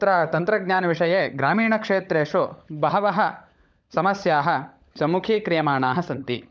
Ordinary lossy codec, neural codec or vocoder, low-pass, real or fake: none; codec, 16 kHz, 16 kbps, FunCodec, trained on LibriTTS, 50 frames a second; none; fake